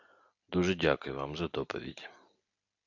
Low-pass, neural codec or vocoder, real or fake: 7.2 kHz; none; real